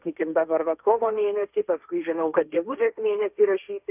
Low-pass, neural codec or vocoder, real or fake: 3.6 kHz; codec, 16 kHz, 1.1 kbps, Voila-Tokenizer; fake